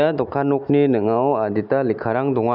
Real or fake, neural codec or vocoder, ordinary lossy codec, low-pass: real; none; none; 5.4 kHz